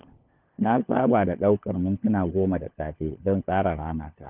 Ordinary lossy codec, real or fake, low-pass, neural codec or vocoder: Opus, 32 kbps; fake; 3.6 kHz; codec, 16 kHz, 4 kbps, FunCodec, trained on LibriTTS, 50 frames a second